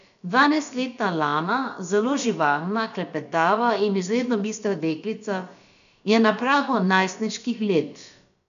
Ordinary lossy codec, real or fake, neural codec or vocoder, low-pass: none; fake; codec, 16 kHz, about 1 kbps, DyCAST, with the encoder's durations; 7.2 kHz